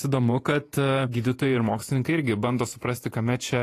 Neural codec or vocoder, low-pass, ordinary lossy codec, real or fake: vocoder, 48 kHz, 128 mel bands, Vocos; 14.4 kHz; AAC, 48 kbps; fake